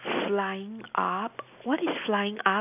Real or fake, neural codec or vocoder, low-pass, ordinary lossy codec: real; none; 3.6 kHz; none